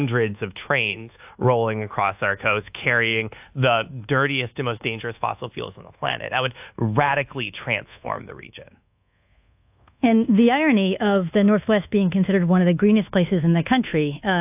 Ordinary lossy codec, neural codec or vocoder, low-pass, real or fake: AAC, 32 kbps; codec, 24 kHz, 1.2 kbps, DualCodec; 3.6 kHz; fake